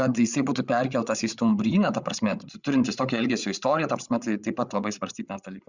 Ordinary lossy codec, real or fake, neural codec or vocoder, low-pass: Opus, 64 kbps; fake; codec, 16 kHz, 8 kbps, FreqCodec, larger model; 7.2 kHz